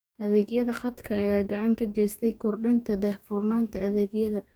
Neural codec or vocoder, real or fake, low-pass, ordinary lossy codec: codec, 44.1 kHz, 2.6 kbps, DAC; fake; none; none